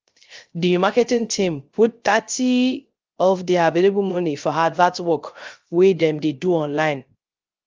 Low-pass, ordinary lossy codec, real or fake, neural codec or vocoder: 7.2 kHz; Opus, 24 kbps; fake; codec, 16 kHz, 0.3 kbps, FocalCodec